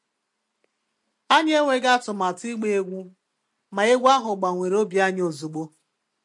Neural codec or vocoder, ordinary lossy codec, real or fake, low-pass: none; MP3, 48 kbps; real; 10.8 kHz